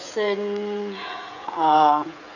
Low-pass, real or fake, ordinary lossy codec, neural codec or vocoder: 7.2 kHz; fake; none; codec, 16 kHz, 16 kbps, FreqCodec, smaller model